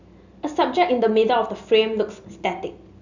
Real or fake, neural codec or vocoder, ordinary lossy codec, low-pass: real; none; none; 7.2 kHz